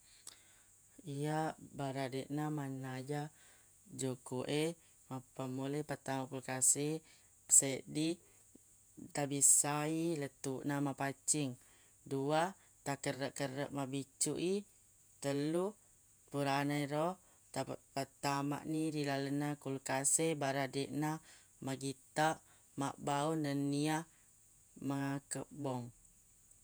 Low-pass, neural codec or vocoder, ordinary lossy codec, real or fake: none; vocoder, 48 kHz, 128 mel bands, Vocos; none; fake